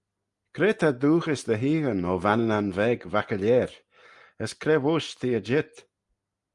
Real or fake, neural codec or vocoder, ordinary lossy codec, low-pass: real; none; Opus, 32 kbps; 10.8 kHz